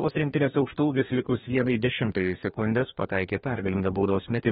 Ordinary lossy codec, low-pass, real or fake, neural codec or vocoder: AAC, 16 kbps; 14.4 kHz; fake; codec, 32 kHz, 1.9 kbps, SNAC